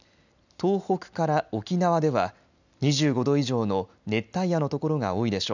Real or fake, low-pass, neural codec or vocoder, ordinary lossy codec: real; 7.2 kHz; none; none